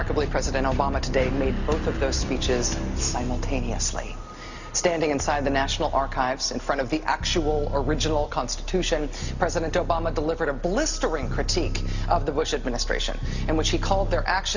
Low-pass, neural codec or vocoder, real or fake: 7.2 kHz; none; real